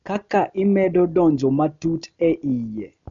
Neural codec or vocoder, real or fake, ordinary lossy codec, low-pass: none; real; MP3, 64 kbps; 7.2 kHz